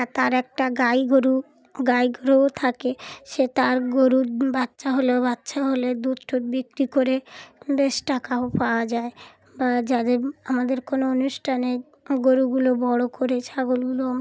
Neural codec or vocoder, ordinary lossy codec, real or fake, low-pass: none; none; real; none